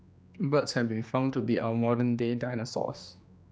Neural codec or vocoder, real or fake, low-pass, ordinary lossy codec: codec, 16 kHz, 2 kbps, X-Codec, HuBERT features, trained on general audio; fake; none; none